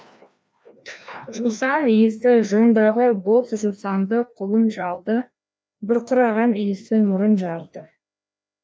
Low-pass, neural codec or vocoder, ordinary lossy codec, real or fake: none; codec, 16 kHz, 1 kbps, FreqCodec, larger model; none; fake